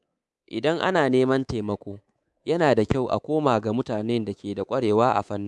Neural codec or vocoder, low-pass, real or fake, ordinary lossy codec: codec, 24 kHz, 3.1 kbps, DualCodec; none; fake; none